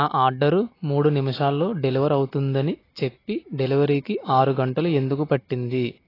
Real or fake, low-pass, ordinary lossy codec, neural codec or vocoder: real; 5.4 kHz; AAC, 24 kbps; none